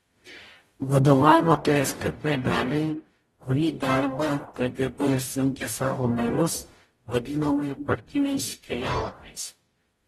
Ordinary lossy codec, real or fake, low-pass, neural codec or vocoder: AAC, 32 kbps; fake; 19.8 kHz; codec, 44.1 kHz, 0.9 kbps, DAC